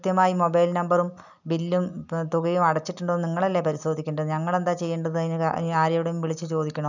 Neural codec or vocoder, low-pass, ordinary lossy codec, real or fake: none; 7.2 kHz; none; real